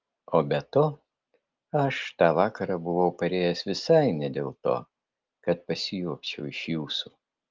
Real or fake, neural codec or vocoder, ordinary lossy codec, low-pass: real; none; Opus, 32 kbps; 7.2 kHz